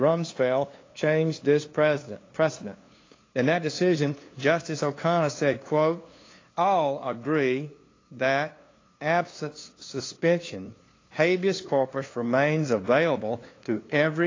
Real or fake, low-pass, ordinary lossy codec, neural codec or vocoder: fake; 7.2 kHz; AAC, 32 kbps; codec, 16 kHz, 2 kbps, FunCodec, trained on LibriTTS, 25 frames a second